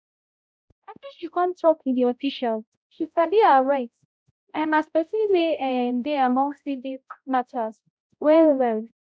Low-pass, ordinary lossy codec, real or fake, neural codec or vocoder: none; none; fake; codec, 16 kHz, 0.5 kbps, X-Codec, HuBERT features, trained on balanced general audio